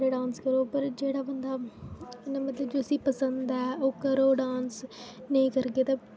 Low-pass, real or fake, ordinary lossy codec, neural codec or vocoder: none; real; none; none